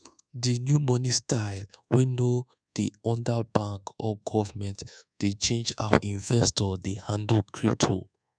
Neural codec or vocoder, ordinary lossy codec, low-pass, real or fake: codec, 24 kHz, 1.2 kbps, DualCodec; none; 9.9 kHz; fake